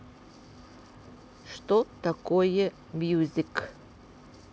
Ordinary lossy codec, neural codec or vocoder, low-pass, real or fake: none; none; none; real